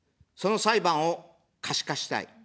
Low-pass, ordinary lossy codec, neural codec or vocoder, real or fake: none; none; none; real